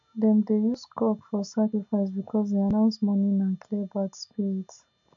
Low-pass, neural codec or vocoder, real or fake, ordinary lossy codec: 7.2 kHz; none; real; AAC, 64 kbps